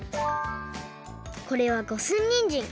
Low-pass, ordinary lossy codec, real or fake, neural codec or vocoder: none; none; real; none